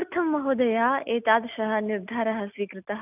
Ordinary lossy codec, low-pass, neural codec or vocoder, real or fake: none; 3.6 kHz; none; real